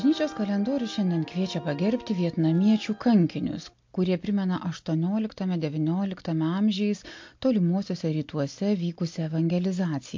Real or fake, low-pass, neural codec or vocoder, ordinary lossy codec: real; 7.2 kHz; none; MP3, 48 kbps